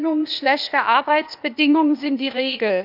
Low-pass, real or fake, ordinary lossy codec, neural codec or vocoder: 5.4 kHz; fake; none; codec, 16 kHz, 0.8 kbps, ZipCodec